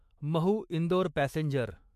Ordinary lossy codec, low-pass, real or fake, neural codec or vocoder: MP3, 64 kbps; 14.4 kHz; fake; autoencoder, 48 kHz, 128 numbers a frame, DAC-VAE, trained on Japanese speech